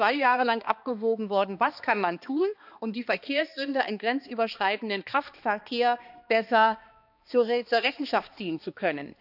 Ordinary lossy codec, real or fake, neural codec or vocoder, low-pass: none; fake; codec, 16 kHz, 2 kbps, X-Codec, HuBERT features, trained on balanced general audio; 5.4 kHz